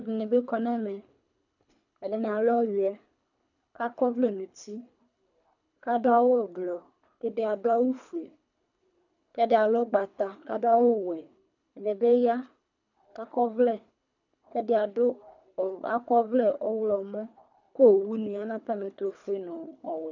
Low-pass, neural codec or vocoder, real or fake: 7.2 kHz; codec, 24 kHz, 3 kbps, HILCodec; fake